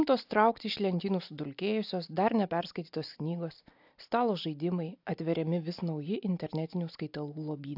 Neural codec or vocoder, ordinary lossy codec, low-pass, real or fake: none; AAC, 48 kbps; 5.4 kHz; real